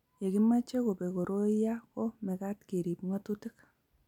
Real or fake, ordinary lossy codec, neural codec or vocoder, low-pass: real; none; none; 19.8 kHz